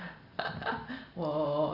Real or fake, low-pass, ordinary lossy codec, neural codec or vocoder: real; 5.4 kHz; Opus, 64 kbps; none